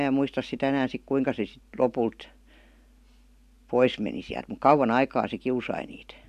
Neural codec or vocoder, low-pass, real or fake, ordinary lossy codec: none; 14.4 kHz; real; AAC, 96 kbps